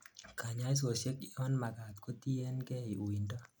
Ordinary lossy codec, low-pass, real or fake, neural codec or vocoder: none; none; real; none